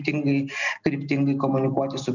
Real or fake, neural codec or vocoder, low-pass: real; none; 7.2 kHz